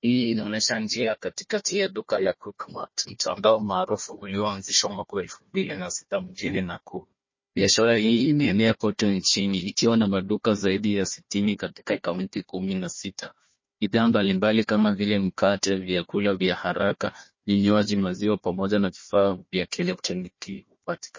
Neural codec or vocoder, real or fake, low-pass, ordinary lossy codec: codec, 16 kHz, 1 kbps, FunCodec, trained on Chinese and English, 50 frames a second; fake; 7.2 kHz; MP3, 32 kbps